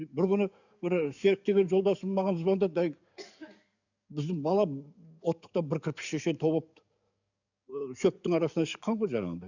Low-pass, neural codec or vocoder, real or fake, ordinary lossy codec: 7.2 kHz; codec, 44.1 kHz, 7.8 kbps, DAC; fake; none